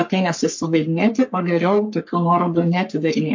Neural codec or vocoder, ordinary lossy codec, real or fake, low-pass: codec, 44.1 kHz, 3.4 kbps, Pupu-Codec; MP3, 48 kbps; fake; 7.2 kHz